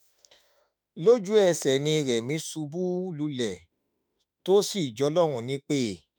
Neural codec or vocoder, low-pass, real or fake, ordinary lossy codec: autoencoder, 48 kHz, 32 numbers a frame, DAC-VAE, trained on Japanese speech; none; fake; none